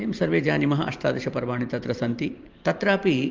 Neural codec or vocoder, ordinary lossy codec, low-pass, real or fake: vocoder, 44.1 kHz, 128 mel bands every 512 samples, BigVGAN v2; Opus, 32 kbps; 7.2 kHz; fake